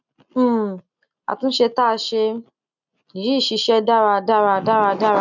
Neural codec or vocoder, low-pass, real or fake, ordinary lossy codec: none; 7.2 kHz; real; none